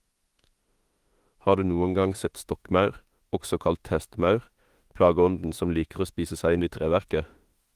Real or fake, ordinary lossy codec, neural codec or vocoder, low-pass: fake; Opus, 24 kbps; autoencoder, 48 kHz, 32 numbers a frame, DAC-VAE, trained on Japanese speech; 14.4 kHz